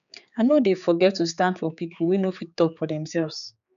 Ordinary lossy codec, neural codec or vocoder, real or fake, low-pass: none; codec, 16 kHz, 4 kbps, X-Codec, HuBERT features, trained on general audio; fake; 7.2 kHz